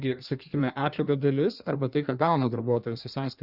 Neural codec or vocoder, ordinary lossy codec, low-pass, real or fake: codec, 16 kHz in and 24 kHz out, 1.1 kbps, FireRedTTS-2 codec; Opus, 64 kbps; 5.4 kHz; fake